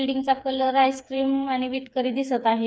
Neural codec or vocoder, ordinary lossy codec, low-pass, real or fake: codec, 16 kHz, 4 kbps, FreqCodec, smaller model; none; none; fake